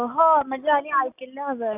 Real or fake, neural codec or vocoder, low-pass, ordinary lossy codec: real; none; 3.6 kHz; none